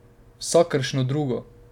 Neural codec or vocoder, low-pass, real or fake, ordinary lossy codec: none; 19.8 kHz; real; none